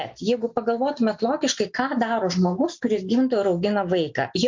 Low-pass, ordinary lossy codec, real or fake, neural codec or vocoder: 7.2 kHz; MP3, 48 kbps; real; none